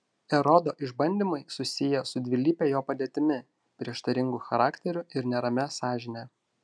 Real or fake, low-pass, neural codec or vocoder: real; 9.9 kHz; none